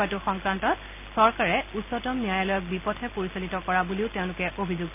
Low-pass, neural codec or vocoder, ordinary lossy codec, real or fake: 3.6 kHz; none; none; real